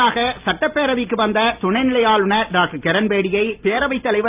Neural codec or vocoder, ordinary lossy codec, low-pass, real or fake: none; Opus, 24 kbps; 3.6 kHz; real